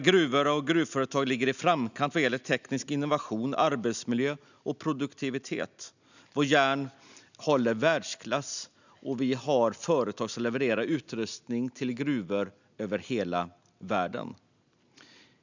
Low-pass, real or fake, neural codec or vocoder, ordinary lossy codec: 7.2 kHz; real; none; none